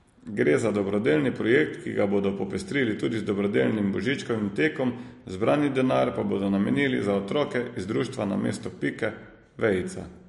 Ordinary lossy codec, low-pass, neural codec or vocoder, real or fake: MP3, 48 kbps; 14.4 kHz; none; real